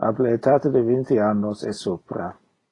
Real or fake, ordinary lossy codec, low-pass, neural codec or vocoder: real; AAC, 32 kbps; 10.8 kHz; none